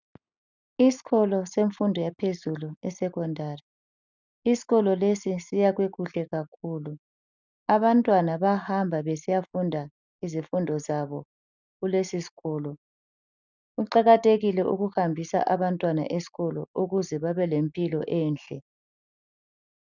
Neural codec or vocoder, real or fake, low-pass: none; real; 7.2 kHz